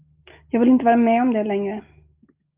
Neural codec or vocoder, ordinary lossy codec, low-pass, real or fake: none; Opus, 24 kbps; 3.6 kHz; real